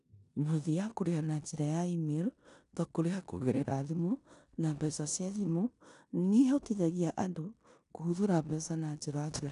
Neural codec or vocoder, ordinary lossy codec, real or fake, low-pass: codec, 16 kHz in and 24 kHz out, 0.9 kbps, LongCat-Audio-Codec, four codebook decoder; none; fake; 10.8 kHz